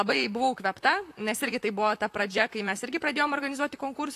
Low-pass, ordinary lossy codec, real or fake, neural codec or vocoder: 14.4 kHz; AAC, 64 kbps; fake; vocoder, 44.1 kHz, 128 mel bands, Pupu-Vocoder